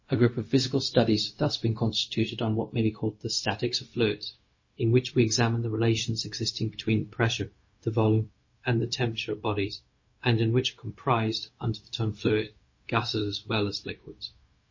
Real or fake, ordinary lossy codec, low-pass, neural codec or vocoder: fake; MP3, 32 kbps; 7.2 kHz; codec, 16 kHz, 0.4 kbps, LongCat-Audio-Codec